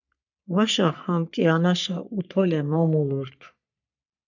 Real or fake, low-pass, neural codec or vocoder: fake; 7.2 kHz; codec, 44.1 kHz, 3.4 kbps, Pupu-Codec